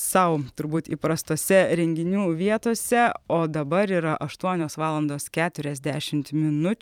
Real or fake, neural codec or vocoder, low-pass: fake; vocoder, 44.1 kHz, 128 mel bands every 512 samples, BigVGAN v2; 19.8 kHz